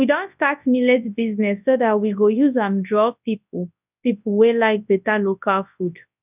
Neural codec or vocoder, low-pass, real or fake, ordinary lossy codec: codec, 24 kHz, 0.9 kbps, WavTokenizer, large speech release; 3.6 kHz; fake; none